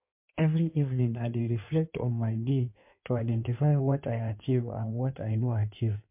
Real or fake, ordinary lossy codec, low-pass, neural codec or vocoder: fake; MP3, 32 kbps; 3.6 kHz; codec, 16 kHz in and 24 kHz out, 1.1 kbps, FireRedTTS-2 codec